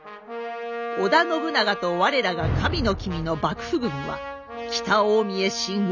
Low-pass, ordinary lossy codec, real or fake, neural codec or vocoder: 7.2 kHz; none; real; none